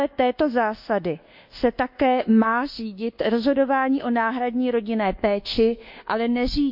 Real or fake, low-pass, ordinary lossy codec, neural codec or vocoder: fake; 5.4 kHz; MP3, 48 kbps; codec, 24 kHz, 1.2 kbps, DualCodec